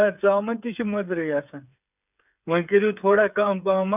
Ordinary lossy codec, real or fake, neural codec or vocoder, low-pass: none; fake; codec, 16 kHz, 8 kbps, FreqCodec, smaller model; 3.6 kHz